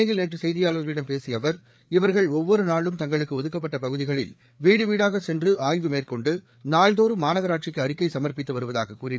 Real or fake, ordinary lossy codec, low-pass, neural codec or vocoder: fake; none; none; codec, 16 kHz, 4 kbps, FreqCodec, larger model